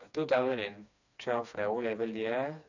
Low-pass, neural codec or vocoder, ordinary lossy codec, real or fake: 7.2 kHz; codec, 16 kHz, 2 kbps, FreqCodec, smaller model; none; fake